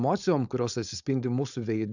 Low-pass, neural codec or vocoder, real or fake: 7.2 kHz; codec, 16 kHz, 4.8 kbps, FACodec; fake